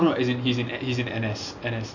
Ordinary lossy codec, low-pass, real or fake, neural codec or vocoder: none; 7.2 kHz; real; none